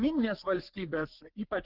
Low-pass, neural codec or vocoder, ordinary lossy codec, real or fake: 5.4 kHz; codec, 44.1 kHz, 3.4 kbps, Pupu-Codec; Opus, 16 kbps; fake